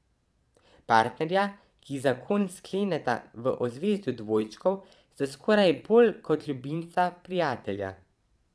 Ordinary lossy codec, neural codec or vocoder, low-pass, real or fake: none; vocoder, 22.05 kHz, 80 mel bands, Vocos; none; fake